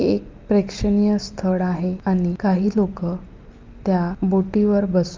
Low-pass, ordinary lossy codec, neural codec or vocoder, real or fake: 7.2 kHz; Opus, 32 kbps; none; real